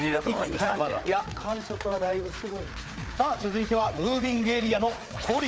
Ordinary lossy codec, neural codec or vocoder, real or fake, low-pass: none; codec, 16 kHz, 4 kbps, FreqCodec, larger model; fake; none